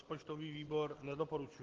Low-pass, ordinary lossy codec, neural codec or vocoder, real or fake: 7.2 kHz; Opus, 16 kbps; none; real